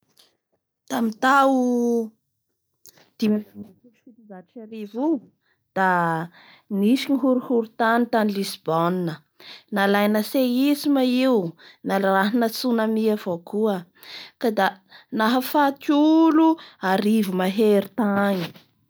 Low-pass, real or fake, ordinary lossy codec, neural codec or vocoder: none; real; none; none